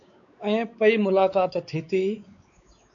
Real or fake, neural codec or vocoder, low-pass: fake; codec, 16 kHz, 4 kbps, X-Codec, WavLM features, trained on Multilingual LibriSpeech; 7.2 kHz